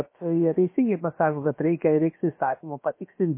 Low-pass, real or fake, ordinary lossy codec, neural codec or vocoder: 3.6 kHz; fake; MP3, 24 kbps; codec, 16 kHz, about 1 kbps, DyCAST, with the encoder's durations